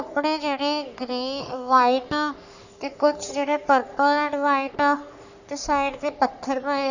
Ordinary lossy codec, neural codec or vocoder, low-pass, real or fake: none; codec, 44.1 kHz, 3.4 kbps, Pupu-Codec; 7.2 kHz; fake